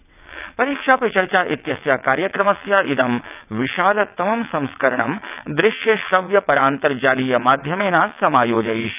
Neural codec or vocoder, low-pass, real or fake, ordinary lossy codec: vocoder, 22.05 kHz, 80 mel bands, WaveNeXt; 3.6 kHz; fake; none